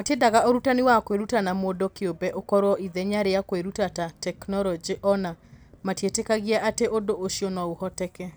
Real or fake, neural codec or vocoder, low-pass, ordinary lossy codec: real; none; none; none